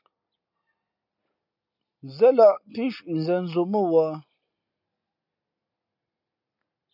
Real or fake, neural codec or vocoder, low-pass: real; none; 5.4 kHz